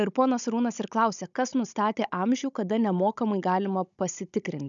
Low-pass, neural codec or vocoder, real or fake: 7.2 kHz; codec, 16 kHz, 16 kbps, FunCodec, trained on Chinese and English, 50 frames a second; fake